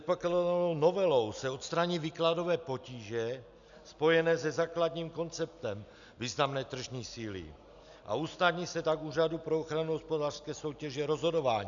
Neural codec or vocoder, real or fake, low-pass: none; real; 7.2 kHz